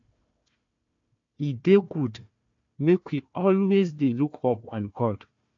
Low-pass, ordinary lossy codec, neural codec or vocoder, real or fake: 7.2 kHz; AAC, 48 kbps; codec, 16 kHz, 1 kbps, FunCodec, trained on Chinese and English, 50 frames a second; fake